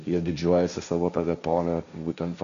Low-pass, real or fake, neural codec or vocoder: 7.2 kHz; fake; codec, 16 kHz, 1.1 kbps, Voila-Tokenizer